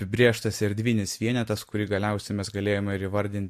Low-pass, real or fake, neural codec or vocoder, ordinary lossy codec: 14.4 kHz; real; none; MP3, 64 kbps